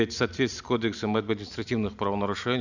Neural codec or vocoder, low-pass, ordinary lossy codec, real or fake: none; 7.2 kHz; none; real